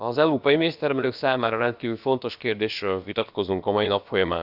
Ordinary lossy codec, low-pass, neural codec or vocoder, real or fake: none; 5.4 kHz; codec, 16 kHz, about 1 kbps, DyCAST, with the encoder's durations; fake